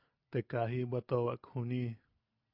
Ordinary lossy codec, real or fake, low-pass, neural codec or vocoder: AAC, 48 kbps; real; 5.4 kHz; none